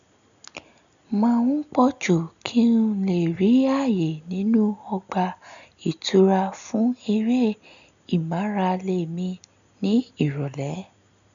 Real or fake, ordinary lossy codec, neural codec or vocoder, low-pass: real; none; none; 7.2 kHz